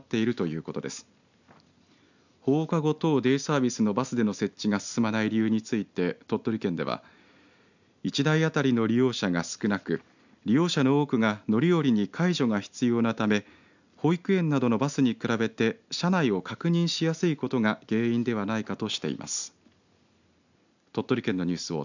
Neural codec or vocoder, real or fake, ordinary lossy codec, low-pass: none; real; none; 7.2 kHz